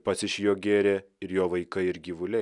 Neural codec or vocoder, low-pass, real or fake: none; 10.8 kHz; real